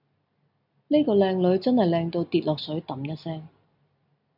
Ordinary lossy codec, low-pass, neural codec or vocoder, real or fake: AAC, 48 kbps; 5.4 kHz; none; real